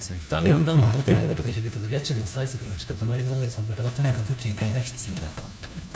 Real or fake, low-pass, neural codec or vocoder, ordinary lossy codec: fake; none; codec, 16 kHz, 1 kbps, FunCodec, trained on LibriTTS, 50 frames a second; none